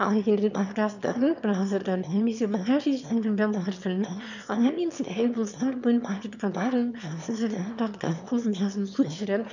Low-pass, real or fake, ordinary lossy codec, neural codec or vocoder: 7.2 kHz; fake; none; autoencoder, 22.05 kHz, a latent of 192 numbers a frame, VITS, trained on one speaker